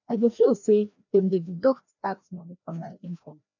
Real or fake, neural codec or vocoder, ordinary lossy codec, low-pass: fake; codec, 16 kHz, 1 kbps, FreqCodec, larger model; none; 7.2 kHz